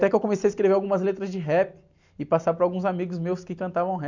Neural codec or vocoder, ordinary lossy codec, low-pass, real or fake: none; none; 7.2 kHz; real